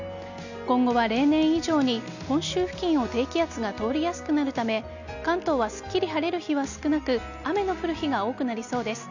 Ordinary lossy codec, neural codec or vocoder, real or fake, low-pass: none; none; real; 7.2 kHz